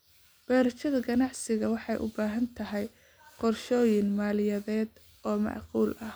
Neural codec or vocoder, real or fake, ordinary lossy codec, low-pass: none; real; none; none